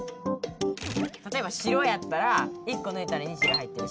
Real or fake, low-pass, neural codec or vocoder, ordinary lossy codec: real; none; none; none